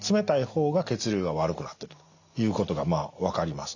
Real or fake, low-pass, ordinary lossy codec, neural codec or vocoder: real; 7.2 kHz; none; none